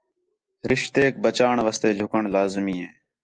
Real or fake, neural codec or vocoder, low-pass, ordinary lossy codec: real; none; 9.9 kHz; Opus, 24 kbps